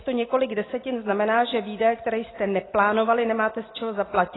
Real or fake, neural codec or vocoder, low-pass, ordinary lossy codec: real; none; 7.2 kHz; AAC, 16 kbps